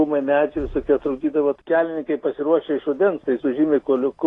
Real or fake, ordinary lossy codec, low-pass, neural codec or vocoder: real; AAC, 32 kbps; 10.8 kHz; none